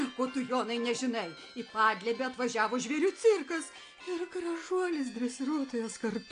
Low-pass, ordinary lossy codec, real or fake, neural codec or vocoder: 9.9 kHz; AAC, 48 kbps; real; none